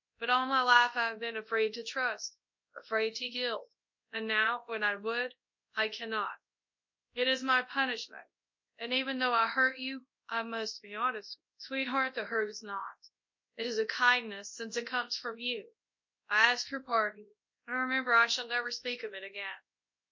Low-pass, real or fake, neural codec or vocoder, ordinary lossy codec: 7.2 kHz; fake; codec, 24 kHz, 0.9 kbps, WavTokenizer, large speech release; MP3, 48 kbps